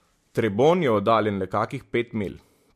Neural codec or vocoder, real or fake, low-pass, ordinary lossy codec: none; real; 14.4 kHz; MP3, 64 kbps